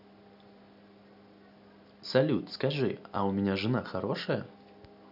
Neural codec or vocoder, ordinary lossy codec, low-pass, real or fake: none; none; 5.4 kHz; real